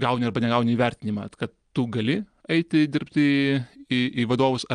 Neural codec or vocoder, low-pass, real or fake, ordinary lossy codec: none; 9.9 kHz; real; Opus, 32 kbps